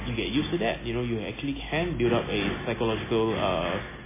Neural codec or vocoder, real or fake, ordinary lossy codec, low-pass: none; real; MP3, 16 kbps; 3.6 kHz